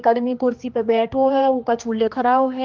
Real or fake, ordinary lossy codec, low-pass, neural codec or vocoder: fake; Opus, 32 kbps; 7.2 kHz; codec, 16 kHz, 2 kbps, X-Codec, HuBERT features, trained on general audio